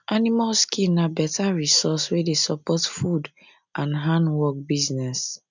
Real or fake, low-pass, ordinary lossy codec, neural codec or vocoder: real; 7.2 kHz; none; none